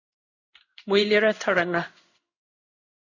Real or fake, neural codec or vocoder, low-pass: fake; codec, 16 kHz in and 24 kHz out, 1 kbps, XY-Tokenizer; 7.2 kHz